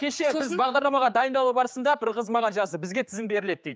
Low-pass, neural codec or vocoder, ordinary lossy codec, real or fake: none; codec, 16 kHz, 4 kbps, X-Codec, HuBERT features, trained on general audio; none; fake